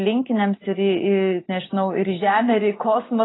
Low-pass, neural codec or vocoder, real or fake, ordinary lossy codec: 7.2 kHz; none; real; AAC, 16 kbps